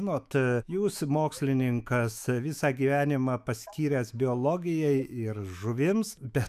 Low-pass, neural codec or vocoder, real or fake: 14.4 kHz; autoencoder, 48 kHz, 128 numbers a frame, DAC-VAE, trained on Japanese speech; fake